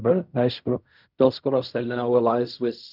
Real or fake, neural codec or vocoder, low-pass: fake; codec, 16 kHz in and 24 kHz out, 0.4 kbps, LongCat-Audio-Codec, fine tuned four codebook decoder; 5.4 kHz